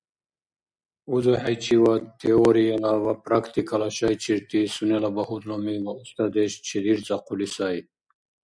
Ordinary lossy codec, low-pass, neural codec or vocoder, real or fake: MP3, 96 kbps; 9.9 kHz; none; real